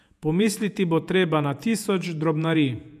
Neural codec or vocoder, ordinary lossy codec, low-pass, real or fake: vocoder, 48 kHz, 128 mel bands, Vocos; none; 14.4 kHz; fake